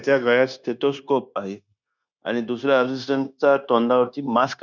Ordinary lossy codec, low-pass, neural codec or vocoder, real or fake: none; 7.2 kHz; codec, 16 kHz, 0.9 kbps, LongCat-Audio-Codec; fake